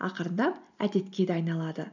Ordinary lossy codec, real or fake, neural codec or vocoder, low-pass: none; real; none; 7.2 kHz